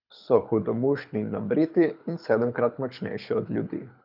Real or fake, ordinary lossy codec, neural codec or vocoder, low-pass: fake; none; codec, 24 kHz, 6 kbps, HILCodec; 5.4 kHz